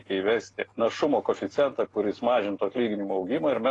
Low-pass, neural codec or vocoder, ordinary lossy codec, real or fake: 10.8 kHz; none; AAC, 32 kbps; real